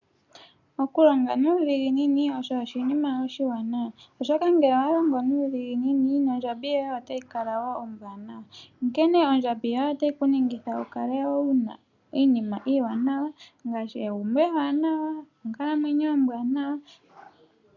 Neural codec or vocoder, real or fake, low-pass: none; real; 7.2 kHz